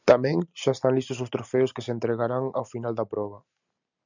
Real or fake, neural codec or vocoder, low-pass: real; none; 7.2 kHz